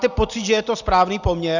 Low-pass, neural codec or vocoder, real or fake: 7.2 kHz; none; real